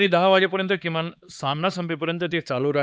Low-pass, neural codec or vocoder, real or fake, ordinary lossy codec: none; codec, 16 kHz, 2 kbps, X-Codec, HuBERT features, trained on LibriSpeech; fake; none